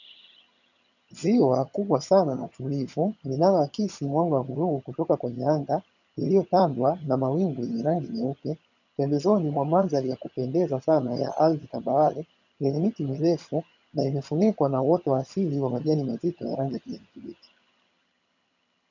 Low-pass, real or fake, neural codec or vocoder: 7.2 kHz; fake; vocoder, 22.05 kHz, 80 mel bands, HiFi-GAN